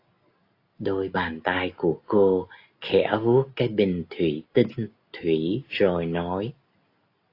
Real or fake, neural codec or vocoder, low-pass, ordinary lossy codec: real; none; 5.4 kHz; AAC, 32 kbps